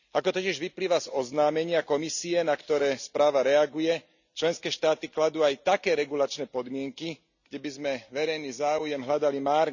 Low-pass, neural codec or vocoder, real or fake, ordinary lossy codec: 7.2 kHz; none; real; none